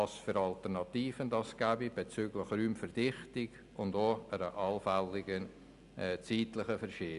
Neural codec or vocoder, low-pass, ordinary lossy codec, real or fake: none; 10.8 kHz; Opus, 64 kbps; real